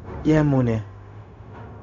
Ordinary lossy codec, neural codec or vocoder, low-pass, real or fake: MP3, 64 kbps; codec, 16 kHz, 0.4 kbps, LongCat-Audio-Codec; 7.2 kHz; fake